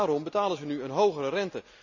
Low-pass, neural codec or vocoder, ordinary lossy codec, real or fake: 7.2 kHz; none; none; real